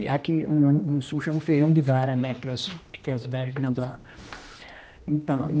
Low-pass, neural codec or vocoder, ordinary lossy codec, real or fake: none; codec, 16 kHz, 1 kbps, X-Codec, HuBERT features, trained on general audio; none; fake